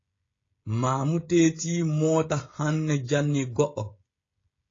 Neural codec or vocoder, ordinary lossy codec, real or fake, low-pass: codec, 16 kHz, 16 kbps, FreqCodec, smaller model; AAC, 32 kbps; fake; 7.2 kHz